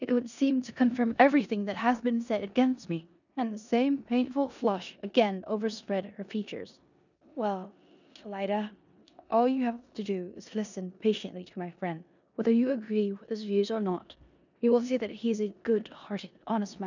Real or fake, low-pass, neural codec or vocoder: fake; 7.2 kHz; codec, 16 kHz in and 24 kHz out, 0.9 kbps, LongCat-Audio-Codec, four codebook decoder